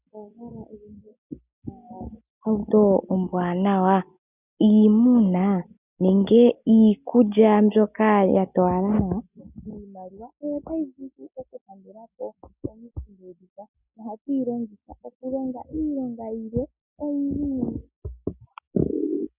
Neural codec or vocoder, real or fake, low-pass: none; real; 3.6 kHz